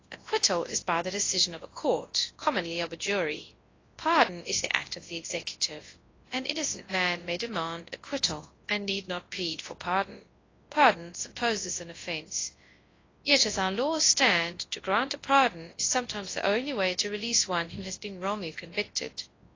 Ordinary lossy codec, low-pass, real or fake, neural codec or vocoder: AAC, 32 kbps; 7.2 kHz; fake; codec, 24 kHz, 0.9 kbps, WavTokenizer, large speech release